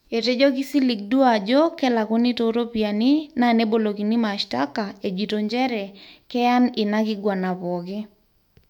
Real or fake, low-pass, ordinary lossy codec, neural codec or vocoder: fake; 19.8 kHz; MP3, 96 kbps; autoencoder, 48 kHz, 128 numbers a frame, DAC-VAE, trained on Japanese speech